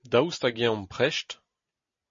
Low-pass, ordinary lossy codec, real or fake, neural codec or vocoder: 7.2 kHz; MP3, 32 kbps; real; none